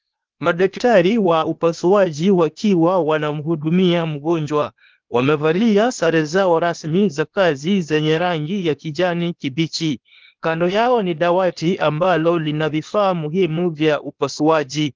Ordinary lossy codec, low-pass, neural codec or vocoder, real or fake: Opus, 32 kbps; 7.2 kHz; codec, 16 kHz, 0.8 kbps, ZipCodec; fake